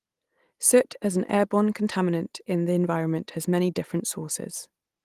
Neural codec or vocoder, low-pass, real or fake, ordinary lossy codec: none; 14.4 kHz; real; Opus, 24 kbps